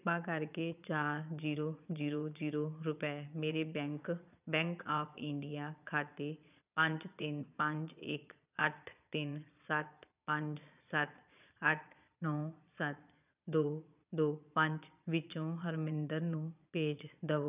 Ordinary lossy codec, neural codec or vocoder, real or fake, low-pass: none; vocoder, 22.05 kHz, 80 mel bands, Vocos; fake; 3.6 kHz